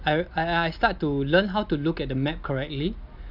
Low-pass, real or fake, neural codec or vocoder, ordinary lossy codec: 5.4 kHz; real; none; none